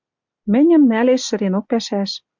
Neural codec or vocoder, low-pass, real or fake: none; 7.2 kHz; real